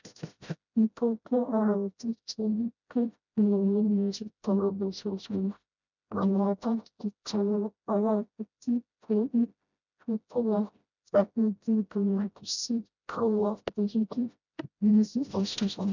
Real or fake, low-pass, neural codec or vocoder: fake; 7.2 kHz; codec, 16 kHz, 0.5 kbps, FreqCodec, smaller model